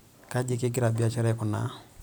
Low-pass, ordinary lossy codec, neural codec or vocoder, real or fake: none; none; none; real